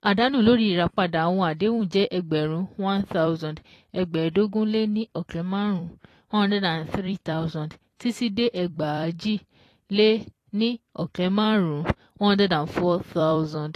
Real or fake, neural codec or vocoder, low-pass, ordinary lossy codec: fake; vocoder, 44.1 kHz, 128 mel bands every 256 samples, BigVGAN v2; 14.4 kHz; AAC, 48 kbps